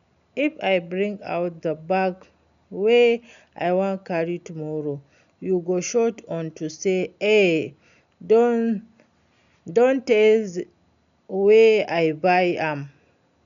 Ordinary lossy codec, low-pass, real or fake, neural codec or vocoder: none; 7.2 kHz; real; none